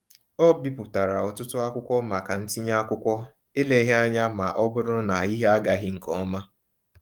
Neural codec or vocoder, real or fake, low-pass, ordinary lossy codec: codec, 44.1 kHz, 7.8 kbps, DAC; fake; 19.8 kHz; Opus, 32 kbps